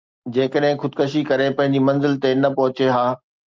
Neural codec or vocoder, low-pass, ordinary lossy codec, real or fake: none; 7.2 kHz; Opus, 24 kbps; real